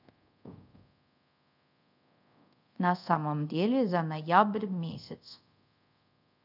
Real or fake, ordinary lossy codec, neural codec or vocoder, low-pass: fake; none; codec, 24 kHz, 0.5 kbps, DualCodec; 5.4 kHz